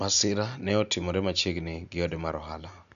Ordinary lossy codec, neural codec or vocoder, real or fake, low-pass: none; none; real; 7.2 kHz